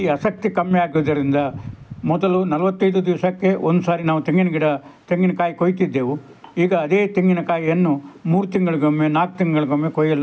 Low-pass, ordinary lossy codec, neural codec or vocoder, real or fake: none; none; none; real